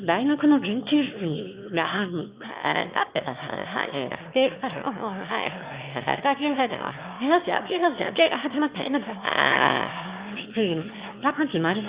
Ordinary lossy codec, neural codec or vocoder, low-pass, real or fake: Opus, 64 kbps; autoencoder, 22.05 kHz, a latent of 192 numbers a frame, VITS, trained on one speaker; 3.6 kHz; fake